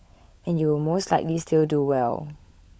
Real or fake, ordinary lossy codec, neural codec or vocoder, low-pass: fake; none; codec, 16 kHz, 16 kbps, FunCodec, trained on LibriTTS, 50 frames a second; none